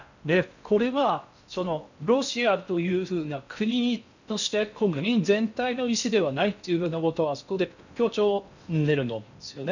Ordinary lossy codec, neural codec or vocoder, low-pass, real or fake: none; codec, 16 kHz in and 24 kHz out, 0.6 kbps, FocalCodec, streaming, 2048 codes; 7.2 kHz; fake